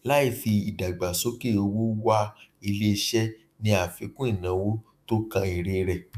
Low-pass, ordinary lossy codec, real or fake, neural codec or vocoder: 14.4 kHz; none; fake; autoencoder, 48 kHz, 128 numbers a frame, DAC-VAE, trained on Japanese speech